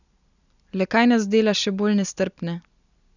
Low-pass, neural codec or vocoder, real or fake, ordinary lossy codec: 7.2 kHz; none; real; none